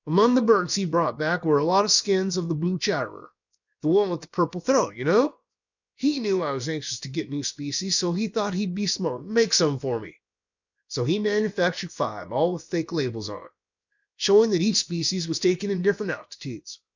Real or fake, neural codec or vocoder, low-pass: fake; codec, 16 kHz, about 1 kbps, DyCAST, with the encoder's durations; 7.2 kHz